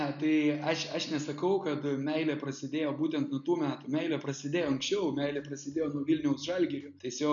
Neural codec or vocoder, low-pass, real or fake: none; 7.2 kHz; real